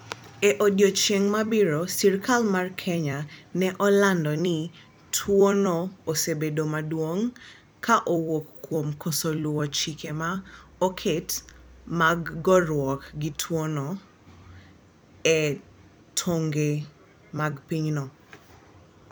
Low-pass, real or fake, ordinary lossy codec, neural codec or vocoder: none; fake; none; vocoder, 44.1 kHz, 128 mel bands every 256 samples, BigVGAN v2